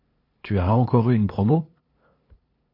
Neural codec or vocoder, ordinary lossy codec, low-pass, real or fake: codec, 16 kHz, 2 kbps, FunCodec, trained on LibriTTS, 25 frames a second; MP3, 32 kbps; 5.4 kHz; fake